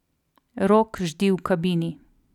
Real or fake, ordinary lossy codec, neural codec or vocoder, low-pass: real; none; none; 19.8 kHz